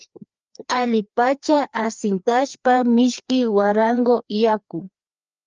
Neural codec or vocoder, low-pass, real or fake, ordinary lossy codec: codec, 16 kHz, 2 kbps, FreqCodec, larger model; 7.2 kHz; fake; Opus, 24 kbps